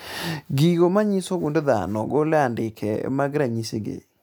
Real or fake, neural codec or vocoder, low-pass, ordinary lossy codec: real; none; none; none